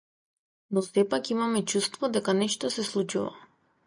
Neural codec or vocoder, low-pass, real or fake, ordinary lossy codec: none; 9.9 kHz; real; MP3, 96 kbps